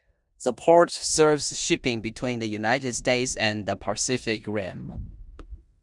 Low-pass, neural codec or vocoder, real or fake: 10.8 kHz; codec, 16 kHz in and 24 kHz out, 0.9 kbps, LongCat-Audio-Codec, four codebook decoder; fake